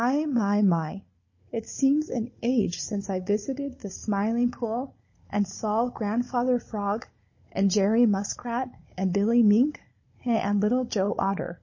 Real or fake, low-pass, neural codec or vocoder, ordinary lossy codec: fake; 7.2 kHz; codec, 16 kHz, 16 kbps, FunCodec, trained on Chinese and English, 50 frames a second; MP3, 32 kbps